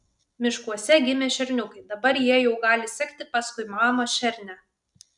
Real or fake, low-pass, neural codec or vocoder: real; 10.8 kHz; none